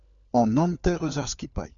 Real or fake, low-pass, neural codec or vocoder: fake; 7.2 kHz; codec, 16 kHz, 4 kbps, FunCodec, trained on LibriTTS, 50 frames a second